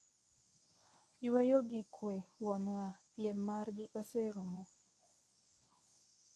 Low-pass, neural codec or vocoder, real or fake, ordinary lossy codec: none; codec, 24 kHz, 0.9 kbps, WavTokenizer, medium speech release version 1; fake; none